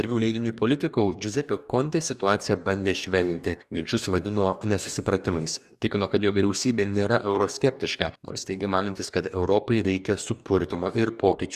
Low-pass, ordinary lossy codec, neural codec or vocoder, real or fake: 14.4 kHz; Opus, 64 kbps; codec, 44.1 kHz, 2.6 kbps, DAC; fake